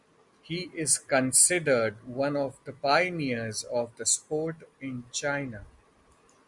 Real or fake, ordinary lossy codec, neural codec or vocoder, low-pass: real; Opus, 64 kbps; none; 10.8 kHz